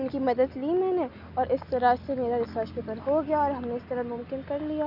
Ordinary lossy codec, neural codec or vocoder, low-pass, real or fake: none; none; 5.4 kHz; real